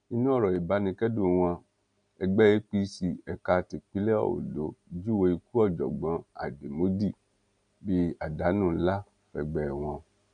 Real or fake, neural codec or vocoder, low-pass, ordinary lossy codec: real; none; 9.9 kHz; none